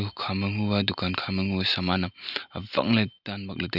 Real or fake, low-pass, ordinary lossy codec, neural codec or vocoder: real; 5.4 kHz; Opus, 64 kbps; none